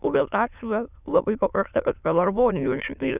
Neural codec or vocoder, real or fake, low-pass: autoencoder, 22.05 kHz, a latent of 192 numbers a frame, VITS, trained on many speakers; fake; 3.6 kHz